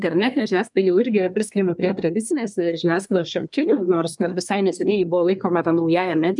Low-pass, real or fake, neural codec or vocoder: 10.8 kHz; fake; codec, 24 kHz, 1 kbps, SNAC